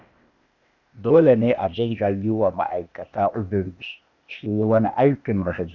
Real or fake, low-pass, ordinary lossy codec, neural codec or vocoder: fake; 7.2 kHz; none; codec, 16 kHz, 0.8 kbps, ZipCodec